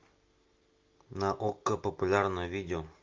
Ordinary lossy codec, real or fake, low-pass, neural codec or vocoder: Opus, 24 kbps; real; 7.2 kHz; none